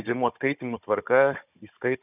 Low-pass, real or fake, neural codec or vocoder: 3.6 kHz; fake; codec, 16 kHz, 16 kbps, FunCodec, trained on Chinese and English, 50 frames a second